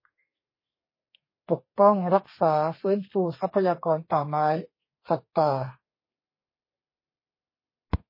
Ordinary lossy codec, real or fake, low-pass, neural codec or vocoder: MP3, 24 kbps; fake; 5.4 kHz; codec, 32 kHz, 1.9 kbps, SNAC